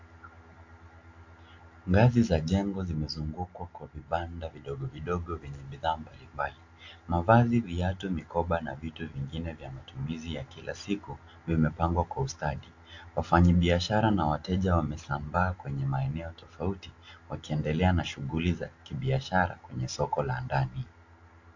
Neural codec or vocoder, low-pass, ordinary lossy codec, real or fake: none; 7.2 kHz; AAC, 48 kbps; real